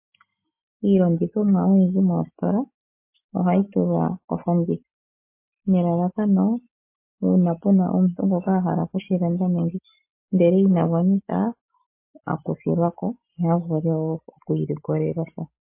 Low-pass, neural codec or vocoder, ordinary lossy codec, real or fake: 3.6 kHz; none; MP3, 24 kbps; real